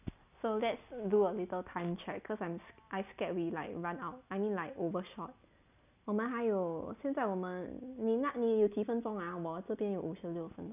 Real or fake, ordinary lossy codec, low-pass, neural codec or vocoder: real; none; 3.6 kHz; none